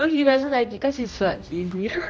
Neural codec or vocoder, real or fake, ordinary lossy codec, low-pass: codec, 16 kHz, 1 kbps, X-Codec, HuBERT features, trained on general audio; fake; none; none